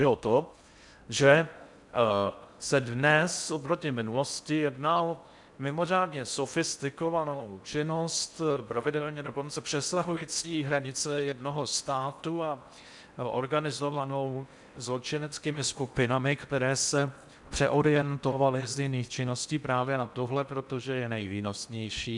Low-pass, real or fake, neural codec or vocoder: 10.8 kHz; fake; codec, 16 kHz in and 24 kHz out, 0.6 kbps, FocalCodec, streaming, 4096 codes